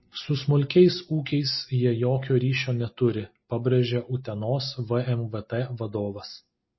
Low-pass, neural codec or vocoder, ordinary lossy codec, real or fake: 7.2 kHz; none; MP3, 24 kbps; real